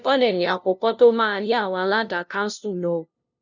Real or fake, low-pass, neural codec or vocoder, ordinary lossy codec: fake; 7.2 kHz; codec, 16 kHz, 0.5 kbps, FunCodec, trained on LibriTTS, 25 frames a second; none